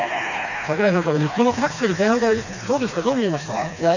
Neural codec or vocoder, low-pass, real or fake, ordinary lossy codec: codec, 16 kHz, 2 kbps, FreqCodec, smaller model; 7.2 kHz; fake; none